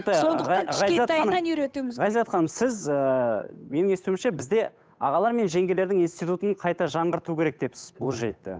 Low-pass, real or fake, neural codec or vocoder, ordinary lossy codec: none; fake; codec, 16 kHz, 8 kbps, FunCodec, trained on Chinese and English, 25 frames a second; none